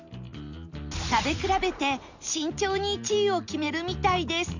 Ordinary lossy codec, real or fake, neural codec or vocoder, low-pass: none; real; none; 7.2 kHz